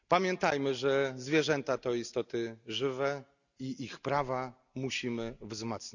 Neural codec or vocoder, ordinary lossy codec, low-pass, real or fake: none; none; 7.2 kHz; real